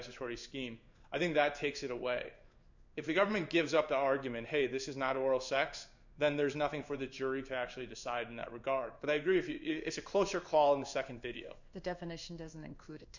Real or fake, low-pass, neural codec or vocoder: fake; 7.2 kHz; codec, 16 kHz in and 24 kHz out, 1 kbps, XY-Tokenizer